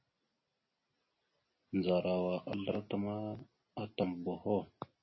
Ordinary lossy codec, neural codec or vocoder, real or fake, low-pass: MP3, 24 kbps; none; real; 7.2 kHz